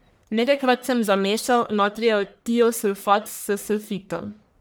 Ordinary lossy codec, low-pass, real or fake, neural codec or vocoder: none; none; fake; codec, 44.1 kHz, 1.7 kbps, Pupu-Codec